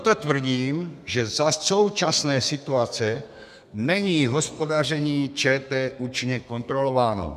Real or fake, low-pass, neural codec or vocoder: fake; 14.4 kHz; codec, 32 kHz, 1.9 kbps, SNAC